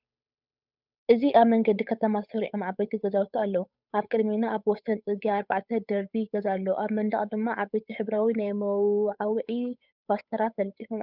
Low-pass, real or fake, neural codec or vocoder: 5.4 kHz; fake; codec, 16 kHz, 8 kbps, FunCodec, trained on Chinese and English, 25 frames a second